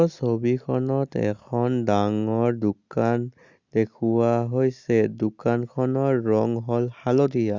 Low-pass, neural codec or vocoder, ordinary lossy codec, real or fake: 7.2 kHz; none; Opus, 64 kbps; real